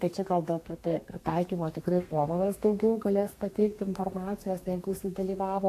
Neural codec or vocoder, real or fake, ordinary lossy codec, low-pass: codec, 32 kHz, 1.9 kbps, SNAC; fake; AAC, 64 kbps; 14.4 kHz